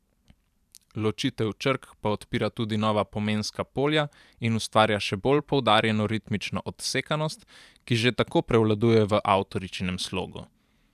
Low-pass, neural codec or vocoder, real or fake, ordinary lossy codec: 14.4 kHz; vocoder, 44.1 kHz, 128 mel bands every 512 samples, BigVGAN v2; fake; none